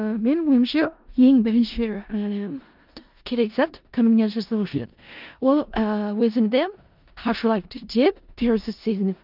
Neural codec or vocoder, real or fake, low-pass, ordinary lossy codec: codec, 16 kHz in and 24 kHz out, 0.4 kbps, LongCat-Audio-Codec, four codebook decoder; fake; 5.4 kHz; Opus, 24 kbps